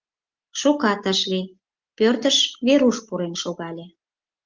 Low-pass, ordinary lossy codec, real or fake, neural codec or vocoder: 7.2 kHz; Opus, 32 kbps; real; none